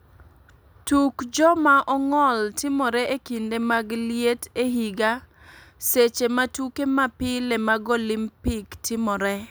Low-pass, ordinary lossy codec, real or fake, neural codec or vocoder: none; none; real; none